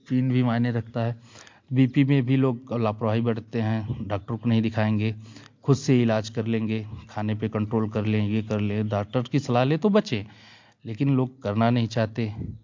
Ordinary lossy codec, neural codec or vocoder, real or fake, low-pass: MP3, 48 kbps; none; real; 7.2 kHz